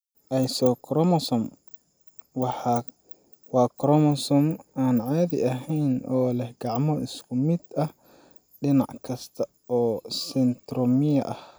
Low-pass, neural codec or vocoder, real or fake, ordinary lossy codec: none; none; real; none